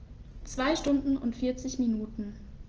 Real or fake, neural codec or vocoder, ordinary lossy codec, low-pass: real; none; Opus, 16 kbps; 7.2 kHz